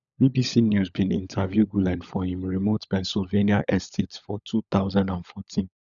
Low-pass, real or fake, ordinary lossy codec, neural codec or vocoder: 7.2 kHz; fake; none; codec, 16 kHz, 16 kbps, FunCodec, trained on LibriTTS, 50 frames a second